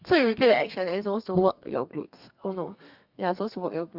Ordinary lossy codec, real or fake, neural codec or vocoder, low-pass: Opus, 64 kbps; fake; codec, 32 kHz, 1.9 kbps, SNAC; 5.4 kHz